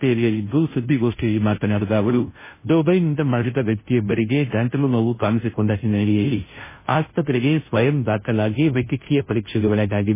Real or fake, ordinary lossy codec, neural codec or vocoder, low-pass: fake; MP3, 16 kbps; codec, 16 kHz, 0.5 kbps, FunCodec, trained on Chinese and English, 25 frames a second; 3.6 kHz